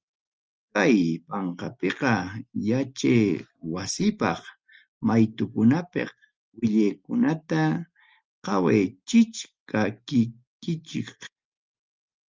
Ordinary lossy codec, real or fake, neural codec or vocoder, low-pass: Opus, 24 kbps; real; none; 7.2 kHz